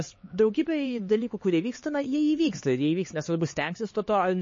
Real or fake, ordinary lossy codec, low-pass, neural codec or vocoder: fake; MP3, 32 kbps; 7.2 kHz; codec, 16 kHz, 4 kbps, X-Codec, HuBERT features, trained on LibriSpeech